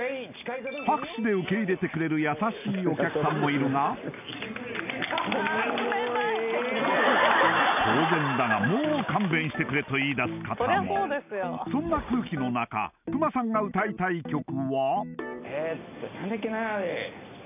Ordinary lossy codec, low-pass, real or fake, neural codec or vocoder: none; 3.6 kHz; real; none